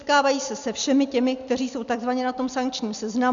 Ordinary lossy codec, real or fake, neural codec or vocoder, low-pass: MP3, 96 kbps; real; none; 7.2 kHz